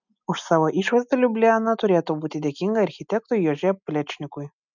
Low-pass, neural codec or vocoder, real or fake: 7.2 kHz; none; real